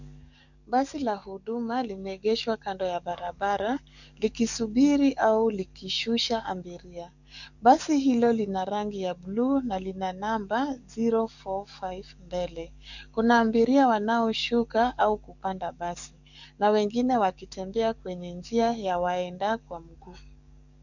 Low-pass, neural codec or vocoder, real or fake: 7.2 kHz; codec, 44.1 kHz, 7.8 kbps, DAC; fake